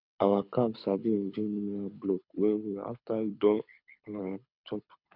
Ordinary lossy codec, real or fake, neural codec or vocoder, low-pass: none; fake; codec, 24 kHz, 6 kbps, HILCodec; 5.4 kHz